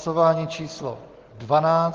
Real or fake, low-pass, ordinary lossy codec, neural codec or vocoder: real; 7.2 kHz; Opus, 16 kbps; none